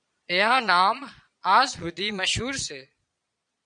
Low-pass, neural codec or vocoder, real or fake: 9.9 kHz; vocoder, 22.05 kHz, 80 mel bands, Vocos; fake